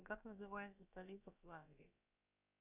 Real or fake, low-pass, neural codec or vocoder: fake; 3.6 kHz; codec, 16 kHz, about 1 kbps, DyCAST, with the encoder's durations